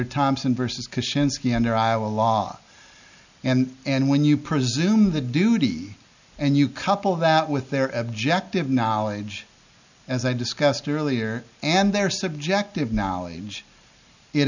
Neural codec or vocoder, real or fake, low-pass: none; real; 7.2 kHz